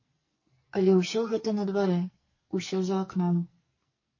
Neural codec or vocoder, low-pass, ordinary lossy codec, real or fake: codec, 44.1 kHz, 2.6 kbps, SNAC; 7.2 kHz; MP3, 32 kbps; fake